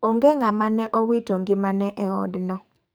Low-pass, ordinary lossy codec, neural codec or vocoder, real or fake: none; none; codec, 44.1 kHz, 2.6 kbps, SNAC; fake